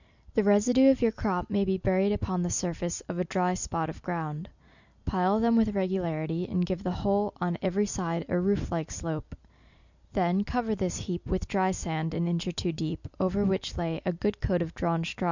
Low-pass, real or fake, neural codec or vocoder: 7.2 kHz; real; none